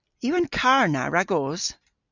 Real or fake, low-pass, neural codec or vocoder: real; 7.2 kHz; none